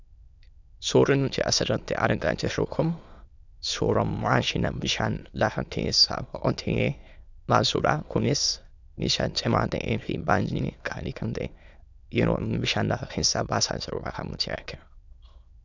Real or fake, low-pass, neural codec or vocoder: fake; 7.2 kHz; autoencoder, 22.05 kHz, a latent of 192 numbers a frame, VITS, trained on many speakers